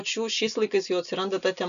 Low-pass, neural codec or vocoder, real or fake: 7.2 kHz; none; real